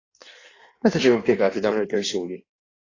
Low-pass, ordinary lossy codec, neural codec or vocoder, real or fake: 7.2 kHz; AAC, 32 kbps; codec, 16 kHz in and 24 kHz out, 1.1 kbps, FireRedTTS-2 codec; fake